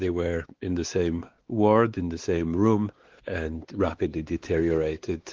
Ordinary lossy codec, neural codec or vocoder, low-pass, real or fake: Opus, 16 kbps; codec, 16 kHz, 4 kbps, X-Codec, WavLM features, trained on Multilingual LibriSpeech; 7.2 kHz; fake